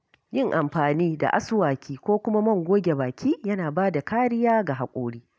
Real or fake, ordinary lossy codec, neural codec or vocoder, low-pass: real; none; none; none